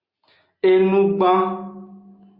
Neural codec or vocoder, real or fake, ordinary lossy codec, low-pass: none; real; Opus, 64 kbps; 5.4 kHz